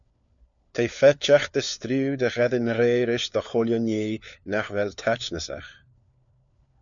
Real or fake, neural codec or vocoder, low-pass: fake; codec, 16 kHz, 4 kbps, FunCodec, trained on LibriTTS, 50 frames a second; 7.2 kHz